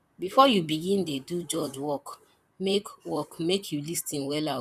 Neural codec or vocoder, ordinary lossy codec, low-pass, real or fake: vocoder, 44.1 kHz, 128 mel bands, Pupu-Vocoder; none; 14.4 kHz; fake